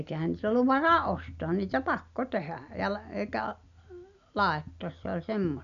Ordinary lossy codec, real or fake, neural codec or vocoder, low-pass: none; real; none; 7.2 kHz